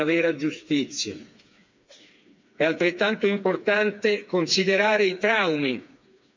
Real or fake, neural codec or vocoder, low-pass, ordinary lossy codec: fake; codec, 16 kHz, 4 kbps, FreqCodec, smaller model; 7.2 kHz; MP3, 64 kbps